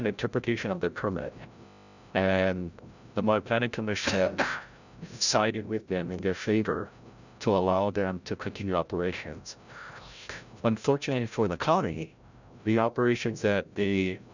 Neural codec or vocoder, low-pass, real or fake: codec, 16 kHz, 0.5 kbps, FreqCodec, larger model; 7.2 kHz; fake